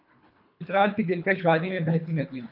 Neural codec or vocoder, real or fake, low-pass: codec, 24 kHz, 3 kbps, HILCodec; fake; 5.4 kHz